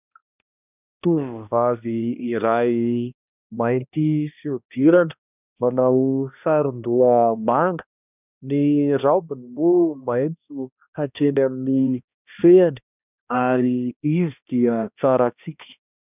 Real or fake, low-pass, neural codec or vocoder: fake; 3.6 kHz; codec, 16 kHz, 1 kbps, X-Codec, HuBERT features, trained on balanced general audio